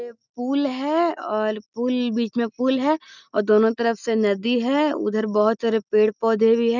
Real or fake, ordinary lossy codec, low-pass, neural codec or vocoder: real; none; 7.2 kHz; none